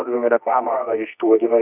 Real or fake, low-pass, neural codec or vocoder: fake; 3.6 kHz; codec, 24 kHz, 0.9 kbps, WavTokenizer, medium music audio release